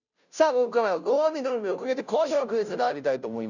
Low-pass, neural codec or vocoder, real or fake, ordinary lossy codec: 7.2 kHz; codec, 16 kHz, 0.5 kbps, FunCodec, trained on Chinese and English, 25 frames a second; fake; none